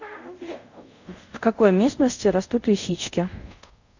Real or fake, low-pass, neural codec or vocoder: fake; 7.2 kHz; codec, 24 kHz, 0.5 kbps, DualCodec